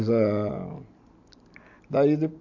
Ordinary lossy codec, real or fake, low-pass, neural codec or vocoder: AAC, 48 kbps; real; 7.2 kHz; none